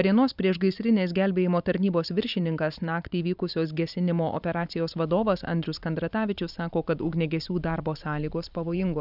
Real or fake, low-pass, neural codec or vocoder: fake; 5.4 kHz; vocoder, 44.1 kHz, 128 mel bands every 512 samples, BigVGAN v2